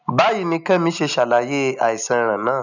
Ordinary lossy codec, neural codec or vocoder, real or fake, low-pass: none; none; real; 7.2 kHz